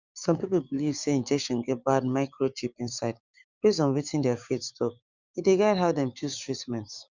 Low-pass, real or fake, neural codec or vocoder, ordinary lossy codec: 7.2 kHz; fake; codec, 16 kHz, 6 kbps, DAC; Opus, 64 kbps